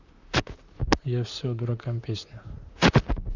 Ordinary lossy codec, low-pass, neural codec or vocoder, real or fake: none; 7.2 kHz; vocoder, 44.1 kHz, 128 mel bands, Pupu-Vocoder; fake